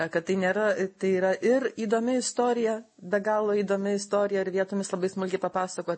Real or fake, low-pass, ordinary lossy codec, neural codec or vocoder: real; 9.9 kHz; MP3, 32 kbps; none